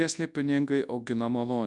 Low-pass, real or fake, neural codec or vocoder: 10.8 kHz; fake; codec, 24 kHz, 0.9 kbps, WavTokenizer, large speech release